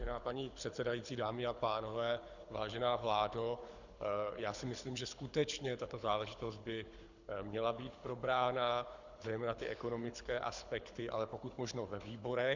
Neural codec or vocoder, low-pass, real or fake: codec, 24 kHz, 6 kbps, HILCodec; 7.2 kHz; fake